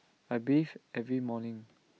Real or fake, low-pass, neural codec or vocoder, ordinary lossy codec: real; none; none; none